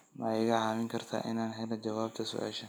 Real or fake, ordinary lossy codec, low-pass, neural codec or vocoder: real; none; none; none